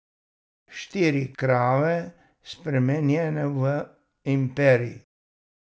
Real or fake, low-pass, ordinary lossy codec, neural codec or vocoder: real; none; none; none